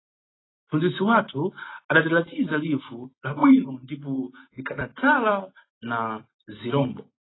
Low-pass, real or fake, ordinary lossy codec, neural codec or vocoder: 7.2 kHz; real; AAC, 16 kbps; none